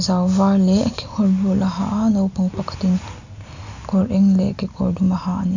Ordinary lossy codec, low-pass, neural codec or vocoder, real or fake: none; 7.2 kHz; none; real